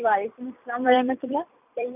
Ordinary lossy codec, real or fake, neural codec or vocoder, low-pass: none; fake; vocoder, 44.1 kHz, 128 mel bands every 256 samples, BigVGAN v2; 3.6 kHz